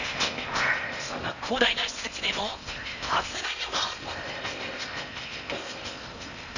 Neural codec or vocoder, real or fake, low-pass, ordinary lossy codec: codec, 16 kHz in and 24 kHz out, 0.8 kbps, FocalCodec, streaming, 65536 codes; fake; 7.2 kHz; none